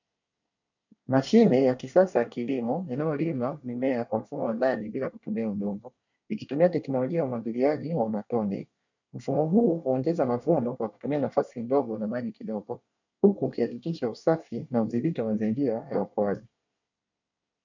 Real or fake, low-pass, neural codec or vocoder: fake; 7.2 kHz; codec, 24 kHz, 1 kbps, SNAC